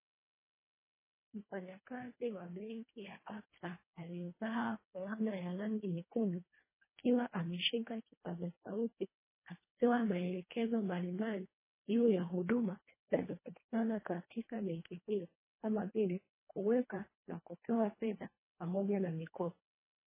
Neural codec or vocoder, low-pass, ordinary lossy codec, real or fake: codec, 24 kHz, 1.5 kbps, HILCodec; 3.6 kHz; MP3, 16 kbps; fake